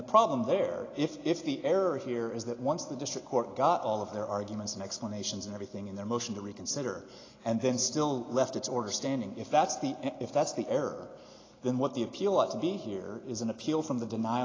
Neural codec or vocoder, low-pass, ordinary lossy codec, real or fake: none; 7.2 kHz; AAC, 32 kbps; real